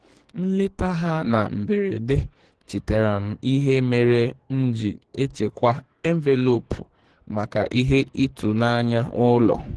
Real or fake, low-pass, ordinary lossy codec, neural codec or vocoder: fake; 10.8 kHz; Opus, 16 kbps; codec, 44.1 kHz, 2.6 kbps, SNAC